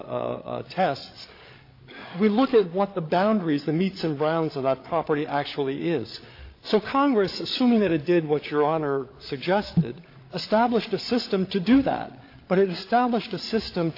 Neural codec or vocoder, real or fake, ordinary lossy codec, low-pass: codec, 16 kHz, 8 kbps, FreqCodec, larger model; fake; AAC, 32 kbps; 5.4 kHz